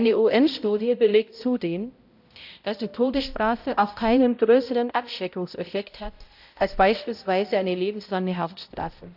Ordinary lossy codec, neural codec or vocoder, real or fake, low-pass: AAC, 48 kbps; codec, 16 kHz, 0.5 kbps, X-Codec, HuBERT features, trained on balanced general audio; fake; 5.4 kHz